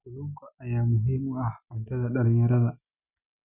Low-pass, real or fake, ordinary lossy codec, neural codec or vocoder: 3.6 kHz; real; none; none